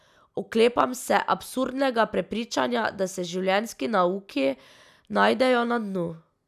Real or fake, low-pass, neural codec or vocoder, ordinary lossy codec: real; 14.4 kHz; none; none